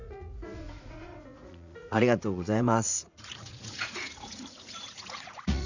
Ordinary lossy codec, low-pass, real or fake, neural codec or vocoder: none; 7.2 kHz; fake; vocoder, 44.1 kHz, 80 mel bands, Vocos